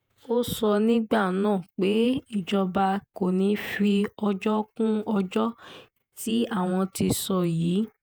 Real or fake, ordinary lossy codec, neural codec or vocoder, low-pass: fake; none; vocoder, 48 kHz, 128 mel bands, Vocos; none